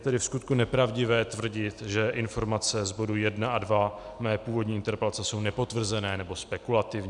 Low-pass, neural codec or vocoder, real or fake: 10.8 kHz; none; real